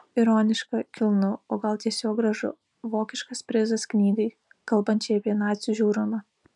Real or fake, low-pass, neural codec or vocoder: real; 10.8 kHz; none